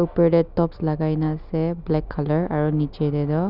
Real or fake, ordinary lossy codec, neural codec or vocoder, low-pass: real; none; none; 5.4 kHz